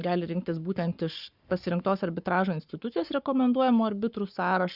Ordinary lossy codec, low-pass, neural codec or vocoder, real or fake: Opus, 64 kbps; 5.4 kHz; codec, 44.1 kHz, 7.8 kbps, Pupu-Codec; fake